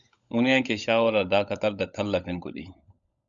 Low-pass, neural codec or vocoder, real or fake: 7.2 kHz; codec, 16 kHz, 16 kbps, FunCodec, trained on LibriTTS, 50 frames a second; fake